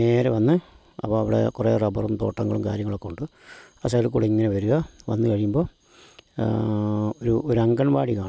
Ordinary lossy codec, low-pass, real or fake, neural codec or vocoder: none; none; real; none